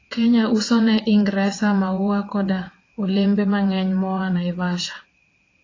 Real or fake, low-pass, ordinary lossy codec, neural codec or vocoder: fake; 7.2 kHz; AAC, 32 kbps; vocoder, 22.05 kHz, 80 mel bands, WaveNeXt